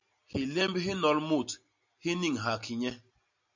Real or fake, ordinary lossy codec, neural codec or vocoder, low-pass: real; MP3, 64 kbps; none; 7.2 kHz